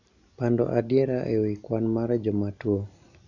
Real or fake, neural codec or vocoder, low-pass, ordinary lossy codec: real; none; 7.2 kHz; none